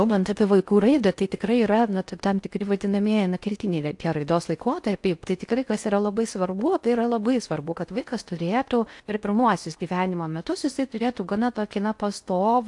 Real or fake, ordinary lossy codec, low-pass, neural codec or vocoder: fake; AAC, 64 kbps; 10.8 kHz; codec, 16 kHz in and 24 kHz out, 0.6 kbps, FocalCodec, streaming, 4096 codes